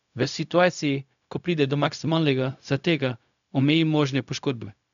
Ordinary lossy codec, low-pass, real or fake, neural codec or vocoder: none; 7.2 kHz; fake; codec, 16 kHz, 0.4 kbps, LongCat-Audio-Codec